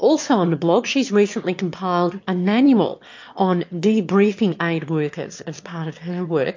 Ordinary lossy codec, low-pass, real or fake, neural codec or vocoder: MP3, 48 kbps; 7.2 kHz; fake; autoencoder, 22.05 kHz, a latent of 192 numbers a frame, VITS, trained on one speaker